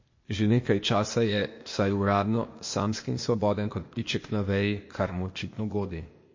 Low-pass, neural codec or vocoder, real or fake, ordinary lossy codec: 7.2 kHz; codec, 16 kHz, 0.8 kbps, ZipCodec; fake; MP3, 32 kbps